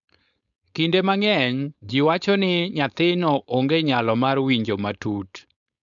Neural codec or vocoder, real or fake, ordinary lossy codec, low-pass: codec, 16 kHz, 4.8 kbps, FACodec; fake; none; 7.2 kHz